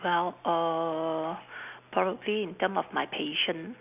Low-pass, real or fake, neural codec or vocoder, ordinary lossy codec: 3.6 kHz; real; none; none